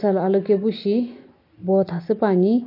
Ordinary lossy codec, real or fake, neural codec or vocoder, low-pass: MP3, 32 kbps; real; none; 5.4 kHz